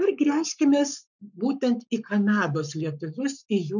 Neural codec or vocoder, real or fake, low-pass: codec, 44.1 kHz, 7.8 kbps, Pupu-Codec; fake; 7.2 kHz